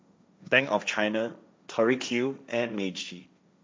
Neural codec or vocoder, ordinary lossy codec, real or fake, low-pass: codec, 16 kHz, 1.1 kbps, Voila-Tokenizer; none; fake; none